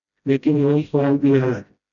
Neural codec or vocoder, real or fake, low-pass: codec, 16 kHz, 0.5 kbps, FreqCodec, smaller model; fake; 7.2 kHz